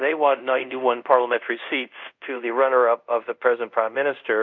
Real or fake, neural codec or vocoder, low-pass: fake; codec, 24 kHz, 0.5 kbps, DualCodec; 7.2 kHz